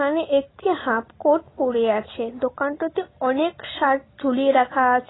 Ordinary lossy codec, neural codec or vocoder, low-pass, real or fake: AAC, 16 kbps; none; 7.2 kHz; real